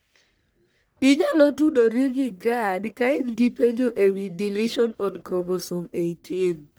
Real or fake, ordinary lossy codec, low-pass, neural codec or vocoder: fake; none; none; codec, 44.1 kHz, 1.7 kbps, Pupu-Codec